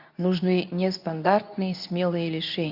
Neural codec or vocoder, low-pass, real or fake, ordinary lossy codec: codec, 24 kHz, 0.9 kbps, WavTokenizer, medium speech release version 2; 5.4 kHz; fake; AAC, 48 kbps